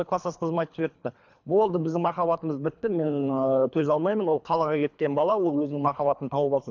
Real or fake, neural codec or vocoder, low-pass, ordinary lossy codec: fake; codec, 24 kHz, 3 kbps, HILCodec; 7.2 kHz; MP3, 64 kbps